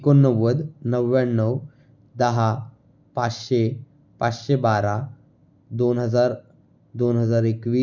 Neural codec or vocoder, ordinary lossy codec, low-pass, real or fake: none; none; 7.2 kHz; real